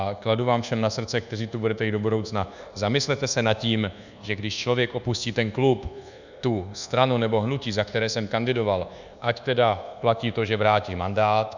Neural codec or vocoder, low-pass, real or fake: codec, 24 kHz, 1.2 kbps, DualCodec; 7.2 kHz; fake